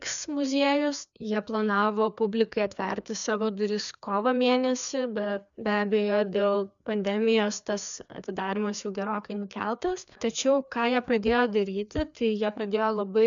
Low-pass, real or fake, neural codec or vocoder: 7.2 kHz; fake; codec, 16 kHz, 2 kbps, FreqCodec, larger model